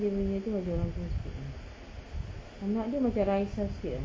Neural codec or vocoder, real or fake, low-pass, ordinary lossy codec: none; real; none; none